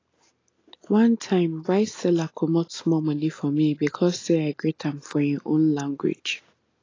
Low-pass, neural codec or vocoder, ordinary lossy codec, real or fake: 7.2 kHz; none; AAC, 32 kbps; real